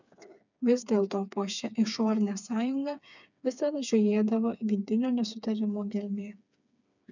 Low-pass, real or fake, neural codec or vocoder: 7.2 kHz; fake; codec, 16 kHz, 4 kbps, FreqCodec, smaller model